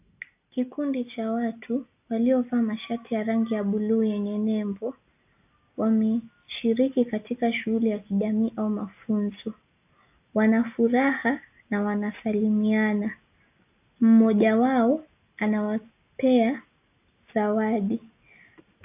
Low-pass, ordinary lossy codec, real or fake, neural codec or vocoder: 3.6 kHz; AAC, 32 kbps; real; none